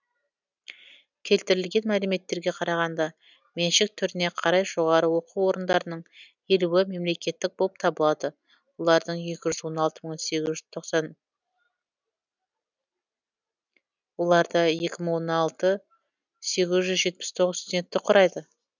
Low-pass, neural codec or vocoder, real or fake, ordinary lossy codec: 7.2 kHz; none; real; none